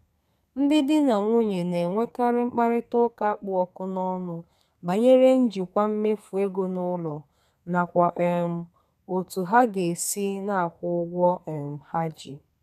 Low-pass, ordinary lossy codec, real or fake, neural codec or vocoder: 14.4 kHz; none; fake; codec, 32 kHz, 1.9 kbps, SNAC